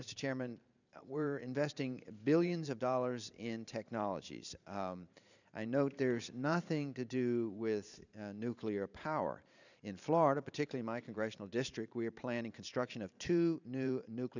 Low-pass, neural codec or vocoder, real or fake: 7.2 kHz; vocoder, 44.1 kHz, 128 mel bands every 512 samples, BigVGAN v2; fake